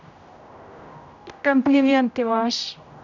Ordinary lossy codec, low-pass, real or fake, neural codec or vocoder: none; 7.2 kHz; fake; codec, 16 kHz, 0.5 kbps, X-Codec, HuBERT features, trained on general audio